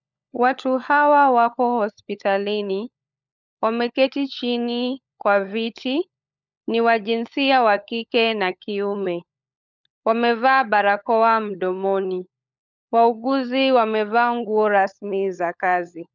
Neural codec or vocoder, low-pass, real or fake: codec, 16 kHz, 16 kbps, FunCodec, trained on LibriTTS, 50 frames a second; 7.2 kHz; fake